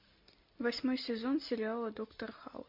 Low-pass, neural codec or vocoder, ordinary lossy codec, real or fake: 5.4 kHz; none; MP3, 32 kbps; real